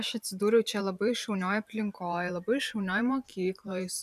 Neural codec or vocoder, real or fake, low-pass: vocoder, 44.1 kHz, 128 mel bands every 512 samples, BigVGAN v2; fake; 14.4 kHz